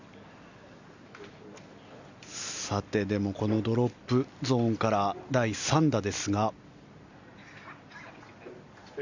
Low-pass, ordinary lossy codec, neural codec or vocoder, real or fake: 7.2 kHz; Opus, 64 kbps; none; real